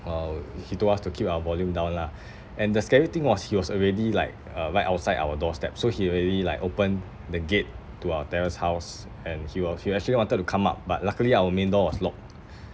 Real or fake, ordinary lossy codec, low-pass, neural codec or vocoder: real; none; none; none